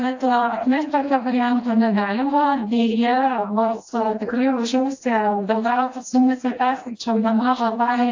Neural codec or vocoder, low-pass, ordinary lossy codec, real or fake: codec, 16 kHz, 1 kbps, FreqCodec, smaller model; 7.2 kHz; AAC, 48 kbps; fake